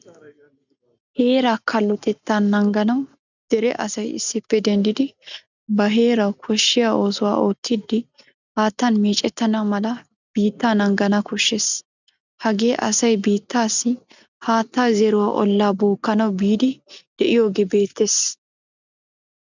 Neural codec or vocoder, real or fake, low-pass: none; real; 7.2 kHz